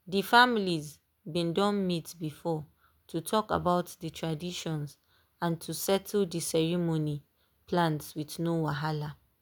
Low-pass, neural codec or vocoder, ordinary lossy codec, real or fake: none; none; none; real